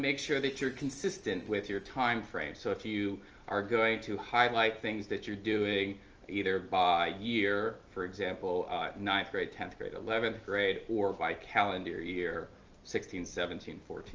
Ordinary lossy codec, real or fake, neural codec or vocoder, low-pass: Opus, 24 kbps; real; none; 7.2 kHz